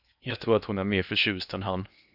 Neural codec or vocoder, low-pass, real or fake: codec, 16 kHz in and 24 kHz out, 0.8 kbps, FocalCodec, streaming, 65536 codes; 5.4 kHz; fake